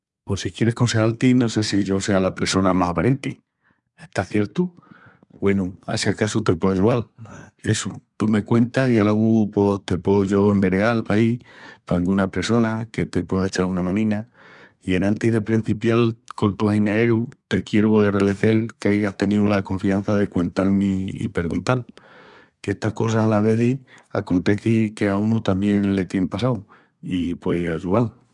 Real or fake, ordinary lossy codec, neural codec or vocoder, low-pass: fake; none; codec, 24 kHz, 1 kbps, SNAC; 10.8 kHz